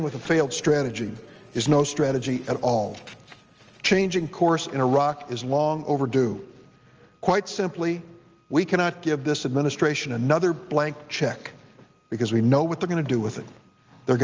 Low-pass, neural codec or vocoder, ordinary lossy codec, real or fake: 7.2 kHz; none; Opus, 24 kbps; real